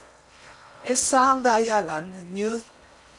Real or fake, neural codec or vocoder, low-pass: fake; codec, 16 kHz in and 24 kHz out, 0.6 kbps, FocalCodec, streaming, 4096 codes; 10.8 kHz